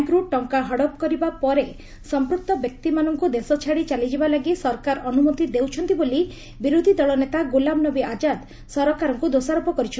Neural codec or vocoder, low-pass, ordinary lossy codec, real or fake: none; none; none; real